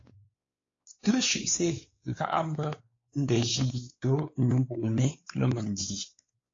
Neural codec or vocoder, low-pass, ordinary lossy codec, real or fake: codec, 16 kHz, 4 kbps, X-Codec, WavLM features, trained on Multilingual LibriSpeech; 7.2 kHz; AAC, 32 kbps; fake